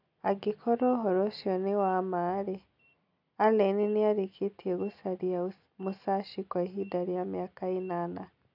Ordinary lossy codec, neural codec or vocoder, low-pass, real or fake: none; none; 5.4 kHz; real